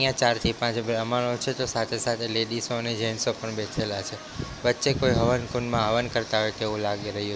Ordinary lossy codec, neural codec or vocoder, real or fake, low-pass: none; none; real; none